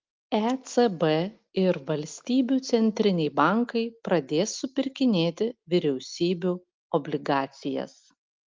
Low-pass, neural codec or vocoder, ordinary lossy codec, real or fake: 7.2 kHz; none; Opus, 32 kbps; real